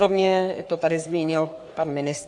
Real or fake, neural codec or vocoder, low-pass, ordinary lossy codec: fake; codec, 44.1 kHz, 3.4 kbps, Pupu-Codec; 10.8 kHz; AAC, 64 kbps